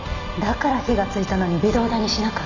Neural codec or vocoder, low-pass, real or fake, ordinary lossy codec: none; 7.2 kHz; real; none